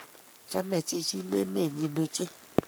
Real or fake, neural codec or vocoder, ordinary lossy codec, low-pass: fake; codec, 44.1 kHz, 3.4 kbps, Pupu-Codec; none; none